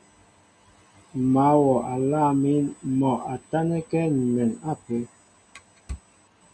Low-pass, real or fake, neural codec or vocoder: 9.9 kHz; real; none